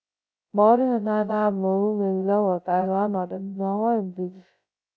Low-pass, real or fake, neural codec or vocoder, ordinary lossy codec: none; fake; codec, 16 kHz, 0.2 kbps, FocalCodec; none